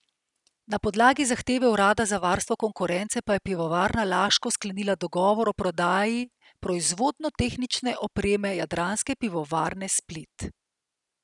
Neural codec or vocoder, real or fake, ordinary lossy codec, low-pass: none; real; none; 10.8 kHz